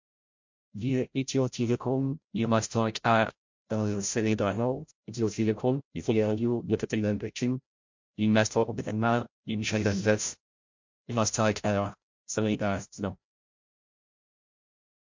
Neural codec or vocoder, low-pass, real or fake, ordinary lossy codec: codec, 16 kHz, 0.5 kbps, FreqCodec, larger model; 7.2 kHz; fake; MP3, 48 kbps